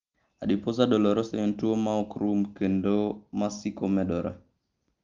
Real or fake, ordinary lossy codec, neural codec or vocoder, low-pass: real; Opus, 32 kbps; none; 7.2 kHz